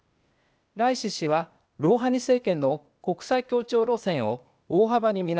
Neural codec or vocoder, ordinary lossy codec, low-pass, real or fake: codec, 16 kHz, 0.8 kbps, ZipCodec; none; none; fake